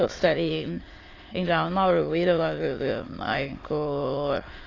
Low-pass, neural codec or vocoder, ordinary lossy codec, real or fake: 7.2 kHz; autoencoder, 22.05 kHz, a latent of 192 numbers a frame, VITS, trained on many speakers; AAC, 32 kbps; fake